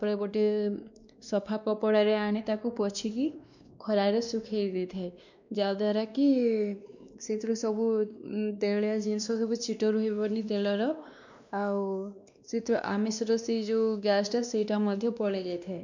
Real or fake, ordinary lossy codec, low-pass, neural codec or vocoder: fake; none; 7.2 kHz; codec, 16 kHz, 2 kbps, X-Codec, WavLM features, trained on Multilingual LibriSpeech